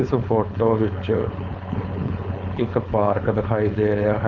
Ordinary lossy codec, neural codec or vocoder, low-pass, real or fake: none; codec, 16 kHz, 4.8 kbps, FACodec; 7.2 kHz; fake